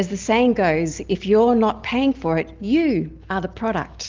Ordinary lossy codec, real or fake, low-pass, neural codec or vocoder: Opus, 32 kbps; real; 7.2 kHz; none